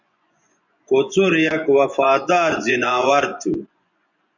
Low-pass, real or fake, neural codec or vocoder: 7.2 kHz; fake; vocoder, 24 kHz, 100 mel bands, Vocos